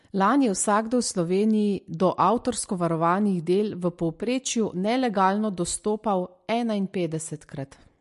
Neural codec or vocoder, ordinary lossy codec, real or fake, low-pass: none; MP3, 48 kbps; real; 14.4 kHz